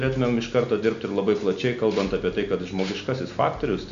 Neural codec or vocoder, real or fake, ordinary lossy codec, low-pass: none; real; MP3, 64 kbps; 7.2 kHz